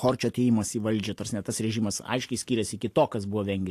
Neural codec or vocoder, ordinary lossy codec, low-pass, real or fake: none; AAC, 64 kbps; 14.4 kHz; real